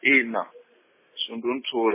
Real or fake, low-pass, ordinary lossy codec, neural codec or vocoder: real; 3.6 kHz; MP3, 16 kbps; none